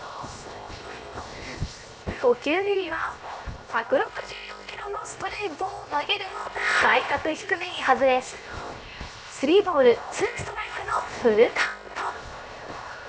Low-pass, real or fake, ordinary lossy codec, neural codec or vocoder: none; fake; none; codec, 16 kHz, 0.7 kbps, FocalCodec